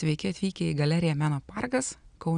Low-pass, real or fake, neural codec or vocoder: 9.9 kHz; real; none